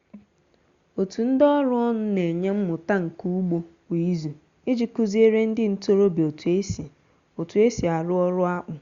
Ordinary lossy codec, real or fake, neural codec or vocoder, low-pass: Opus, 64 kbps; real; none; 7.2 kHz